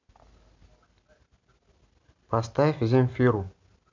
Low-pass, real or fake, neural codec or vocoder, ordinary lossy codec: 7.2 kHz; real; none; MP3, 64 kbps